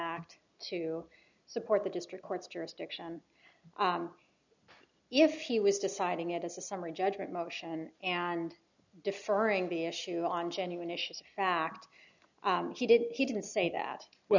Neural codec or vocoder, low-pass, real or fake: none; 7.2 kHz; real